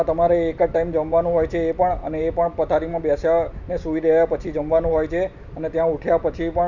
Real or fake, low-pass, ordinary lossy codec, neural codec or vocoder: real; 7.2 kHz; none; none